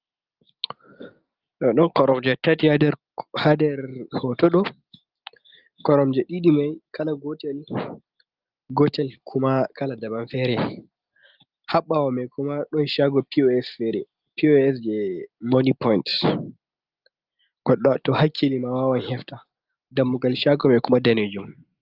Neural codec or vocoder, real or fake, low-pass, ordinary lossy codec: none; real; 5.4 kHz; Opus, 32 kbps